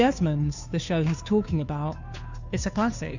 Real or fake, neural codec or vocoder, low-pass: fake; codec, 16 kHz, 2 kbps, FunCodec, trained on Chinese and English, 25 frames a second; 7.2 kHz